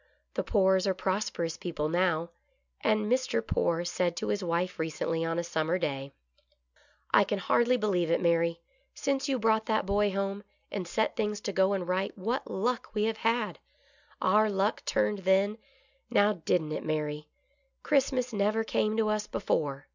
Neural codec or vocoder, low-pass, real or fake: none; 7.2 kHz; real